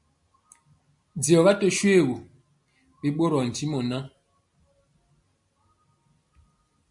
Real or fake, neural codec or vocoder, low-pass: real; none; 10.8 kHz